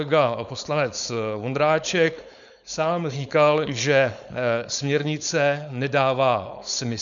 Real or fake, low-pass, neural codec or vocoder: fake; 7.2 kHz; codec, 16 kHz, 4.8 kbps, FACodec